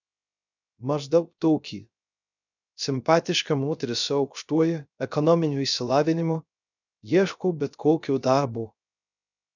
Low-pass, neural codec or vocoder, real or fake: 7.2 kHz; codec, 16 kHz, 0.3 kbps, FocalCodec; fake